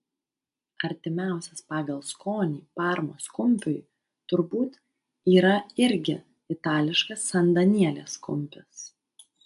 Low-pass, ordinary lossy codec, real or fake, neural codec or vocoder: 10.8 kHz; AAC, 96 kbps; real; none